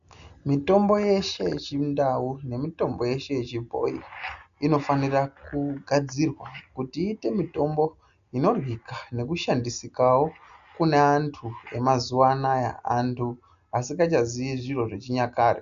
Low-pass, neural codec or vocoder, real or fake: 7.2 kHz; none; real